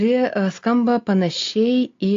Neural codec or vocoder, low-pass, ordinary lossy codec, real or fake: none; 7.2 kHz; AAC, 48 kbps; real